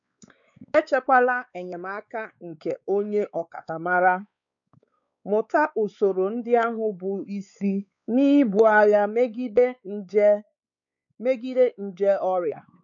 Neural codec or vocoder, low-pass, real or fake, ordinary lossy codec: codec, 16 kHz, 4 kbps, X-Codec, WavLM features, trained on Multilingual LibriSpeech; 7.2 kHz; fake; none